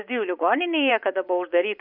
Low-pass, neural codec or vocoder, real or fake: 5.4 kHz; vocoder, 24 kHz, 100 mel bands, Vocos; fake